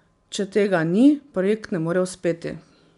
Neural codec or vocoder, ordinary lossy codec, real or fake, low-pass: none; none; real; 10.8 kHz